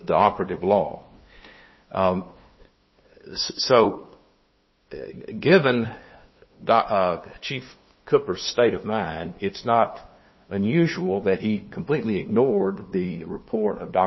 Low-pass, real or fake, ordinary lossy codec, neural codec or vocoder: 7.2 kHz; fake; MP3, 24 kbps; codec, 16 kHz, 2 kbps, FunCodec, trained on LibriTTS, 25 frames a second